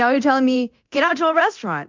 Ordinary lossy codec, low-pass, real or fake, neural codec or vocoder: MP3, 64 kbps; 7.2 kHz; fake; codec, 24 kHz, 0.9 kbps, DualCodec